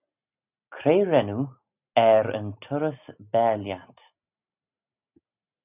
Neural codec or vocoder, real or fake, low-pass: none; real; 3.6 kHz